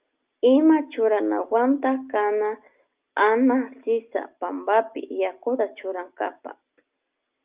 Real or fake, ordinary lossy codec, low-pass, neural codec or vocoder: real; Opus, 32 kbps; 3.6 kHz; none